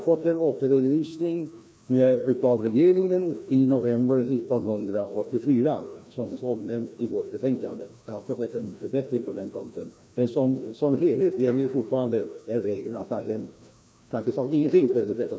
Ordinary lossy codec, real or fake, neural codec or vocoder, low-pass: none; fake; codec, 16 kHz, 1 kbps, FreqCodec, larger model; none